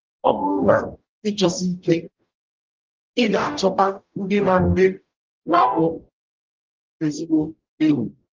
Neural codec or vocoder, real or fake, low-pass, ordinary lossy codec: codec, 44.1 kHz, 0.9 kbps, DAC; fake; 7.2 kHz; Opus, 24 kbps